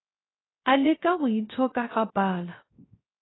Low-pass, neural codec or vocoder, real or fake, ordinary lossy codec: 7.2 kHz; codec, 16 kHz, 0.3 kbps, FocalCodec; fake; AAC, 16 kbps